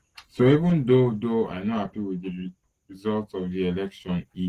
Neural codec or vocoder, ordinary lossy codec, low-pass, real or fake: none; Opus, 16 kbps; 14.4 kHz; real